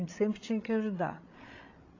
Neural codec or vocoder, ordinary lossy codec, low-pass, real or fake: codec, 16 kHz, 16 kbps, FreqCodec, larger model; AAC, 32 kbps; 7.2 kHz; fake